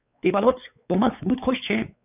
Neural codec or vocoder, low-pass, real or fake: codec, 16 kHz, 4 kbps, X-Codec, WavLM features, trained on Multilingual LibriSpeech; 3.6 kHz; fake